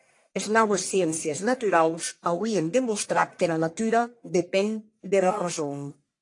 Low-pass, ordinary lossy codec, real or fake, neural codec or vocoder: 10.8 kHz; AAC, 48 kbps; fake; codec, 44.1 kHz, 1.7 kbps, Pupu-Codec